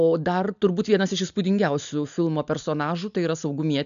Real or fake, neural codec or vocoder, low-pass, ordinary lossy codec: real; none; 7.2 kHz; AAC, 96 kbps